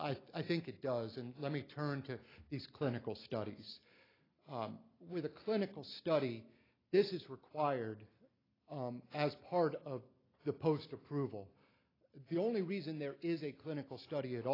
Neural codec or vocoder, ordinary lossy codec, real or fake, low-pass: none; AAC, 24 kbps; real; 5.4 kHz